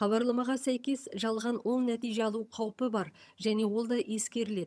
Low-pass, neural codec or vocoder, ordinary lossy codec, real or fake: none; vocoder, 22.05 kHz, 80 mel bands, HiFi-GAN; none; fake